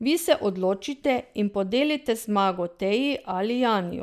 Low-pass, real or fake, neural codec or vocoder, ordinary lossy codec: 14.4 kHz; real; none; none